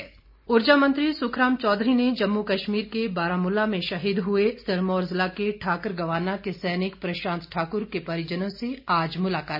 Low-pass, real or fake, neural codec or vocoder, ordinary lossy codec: 5.4 kHz; real; none; none